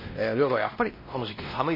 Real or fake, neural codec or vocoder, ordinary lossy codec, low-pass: fake; codec, 16 kHz, 1 kbps, X-Codec, WavLM features, trained on Multilingual LibriSpeech; MP3, 24 kbps; 5.4 kHz